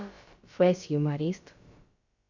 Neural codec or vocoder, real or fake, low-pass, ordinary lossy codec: codec, 16 kHz, about 1 kbps, DyCAST, with the encoder's durations; fake; 7.2 kHz; Opus, 64 kbps